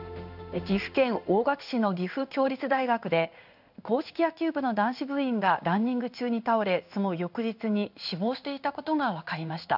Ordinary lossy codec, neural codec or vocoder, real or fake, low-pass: none; codec, 16 kHz in and 24 kHz out, 1 kbps, XY-Tokenizer; fake; 5.4 kHz